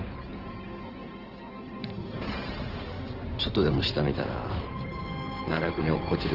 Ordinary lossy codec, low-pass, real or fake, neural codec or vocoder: Opus, 16 kbps; 5.4 kHz; fake; codec, 16 kHz in and 24 kHz out, 2.2 kbps, FireRedTTS-2 codec